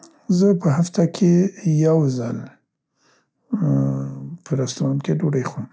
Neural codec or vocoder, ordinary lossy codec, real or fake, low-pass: none; none; real; none